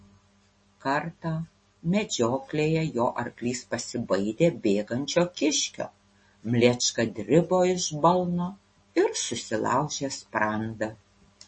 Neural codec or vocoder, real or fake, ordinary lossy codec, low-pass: none; real; MP3, 32 kbps; 9.9 kHz